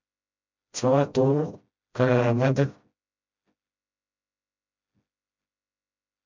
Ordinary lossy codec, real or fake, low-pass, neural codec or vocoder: MP3, 64 kbps; fake; 7.2 kHz; codec, 16 kHz, 0.5 kbps, FreqCodec, smaller model